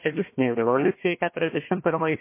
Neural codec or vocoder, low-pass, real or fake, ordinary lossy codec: codec, 16 kHz, 1 kbps, FunCodec, trained on Chinese and English, 50 frames a second; 3.6 kHz; fake; MP3, 24 kbps